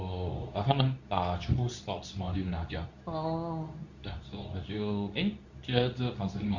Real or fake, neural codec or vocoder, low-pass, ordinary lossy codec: fake; codec, 24 kHz, 0.9 kbps, WavTokenizer, medium speech release version 2; 7.2 kHz; none